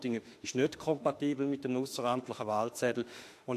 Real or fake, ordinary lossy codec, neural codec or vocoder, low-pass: fake; AAC, 64 kbps; autoencoder, 48 kHz, 32 numbers a frame, DAC-VAE, trained on Japanese speech; 14.4 kHz